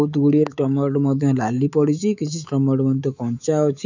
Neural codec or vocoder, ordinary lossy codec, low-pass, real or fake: autoencoder, 48 kHz, 128 numbers a frame, DAC-VAE, trained on Japanese speech; none; 7.2 kHz; fake